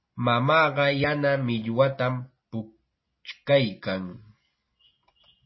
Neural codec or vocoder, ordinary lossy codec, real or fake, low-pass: none; MP3, 24 kbps; real; 7.2 kHz